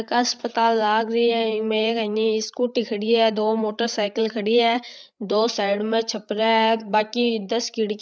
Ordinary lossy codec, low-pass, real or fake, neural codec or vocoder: none; none; fake; codec, 16 kHz, 8 kbps, FreqCodec, larger model